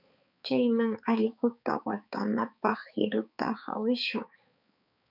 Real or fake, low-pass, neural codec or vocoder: fake; 5.4 kHz; codec, 16 kHz, 4 kbps, X-Codec, HuBERT features, trained on balanced general audio